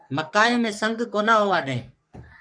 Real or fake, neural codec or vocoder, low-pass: fake; codec, 44.1 kHz, 3.4 kbps, Pupu-Codec; 9.9 kHz